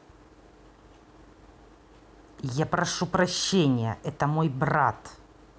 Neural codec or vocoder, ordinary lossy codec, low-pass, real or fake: none; none; none; real